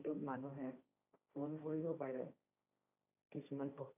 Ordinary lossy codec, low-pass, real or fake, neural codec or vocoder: none; 3.6 kHz; fake; codec, 16 kHz, 1.1 kbps, Voila-Tokenizer